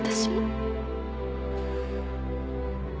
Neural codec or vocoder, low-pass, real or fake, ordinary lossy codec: none; none; real; none